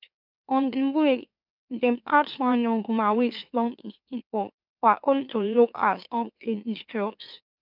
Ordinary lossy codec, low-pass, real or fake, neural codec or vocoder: none; 5.4 kHz; fake; autoencoder, 44.1 kHz, a latent of 192 numbers a frame, MeloTTS